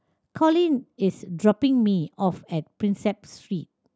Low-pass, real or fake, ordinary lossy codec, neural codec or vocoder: none; real; none; none